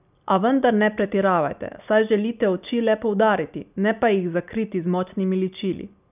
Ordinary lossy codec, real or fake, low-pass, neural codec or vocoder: none; real; 3.6 kHz; none